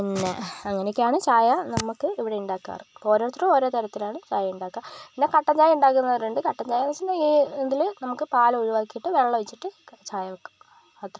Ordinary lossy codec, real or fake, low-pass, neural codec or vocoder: none; real; none; none